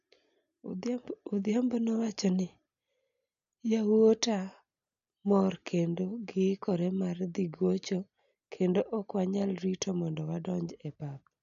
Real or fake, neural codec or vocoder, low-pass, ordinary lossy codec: real; none; 7.2 kHz; none